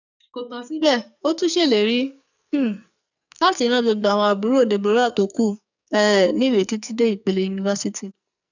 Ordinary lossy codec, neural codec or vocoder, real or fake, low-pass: none; codec, 44.1 kHz, 2.6 kbps, SNAC; fake; 7.2 kHz